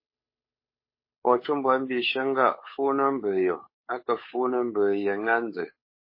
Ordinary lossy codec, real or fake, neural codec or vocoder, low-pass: MP3, 24 kbps; fake; codec, 16 kHz, 8 kbps, FunCodec, trained on Chinese and English, 25 frames a second; 5.4 kHz